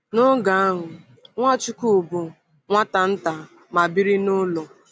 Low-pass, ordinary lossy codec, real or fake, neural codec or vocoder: none; none; real; none